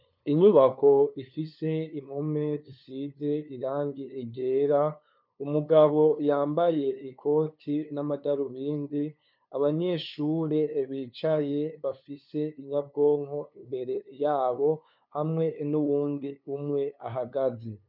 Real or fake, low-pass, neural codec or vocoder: fake; 5.4 kHz; codec, 16 kHz, 2 kbps, FunCodec, trained on LibriTTS, 25 frames a second